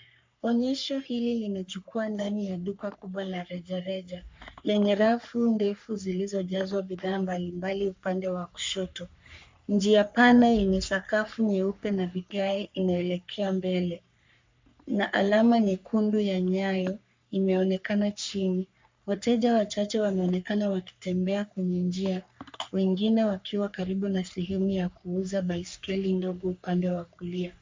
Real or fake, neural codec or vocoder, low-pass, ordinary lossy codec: fake; codec, 44.1 kHz, 3.4 kbps, Pupu-Codec; 7.2 kHz; MP3, 64 kbps